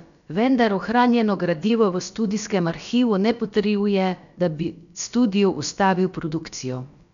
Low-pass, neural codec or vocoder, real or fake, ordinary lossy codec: 7.2 kHz; codec, 16 kHz, about 1 kbps, DyCAST, with the encoder's durations; fake; none